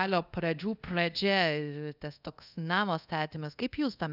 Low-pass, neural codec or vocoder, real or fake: 5.4 kHz; codec, 24 kHz, 0.5 kbps, DualCodec; fake